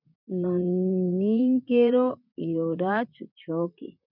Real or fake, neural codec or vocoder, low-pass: fake; vocoder, 44.1 kHz, 128 mel bands, Pupu-Vocoder; 5.4 kHz